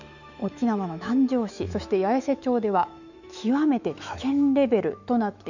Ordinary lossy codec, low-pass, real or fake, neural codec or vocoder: none; 7.2 kHz; fake; autoencoder, 48 kHz, 128 numbers a frame, DAC-VAE, trained on Japanese speech